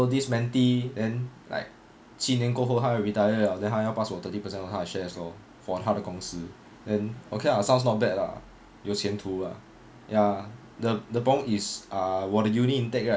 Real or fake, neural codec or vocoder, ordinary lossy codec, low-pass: real; none; none; none